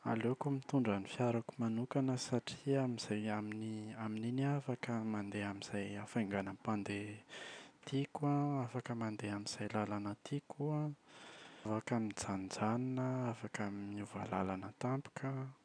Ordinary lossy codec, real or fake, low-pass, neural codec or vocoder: none; real; 9.9 kHz; none